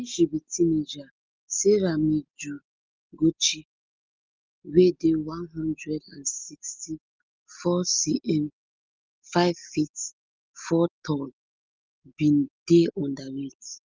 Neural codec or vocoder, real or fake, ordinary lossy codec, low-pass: none; real; Opus, 32 kbps; 7.2 kHz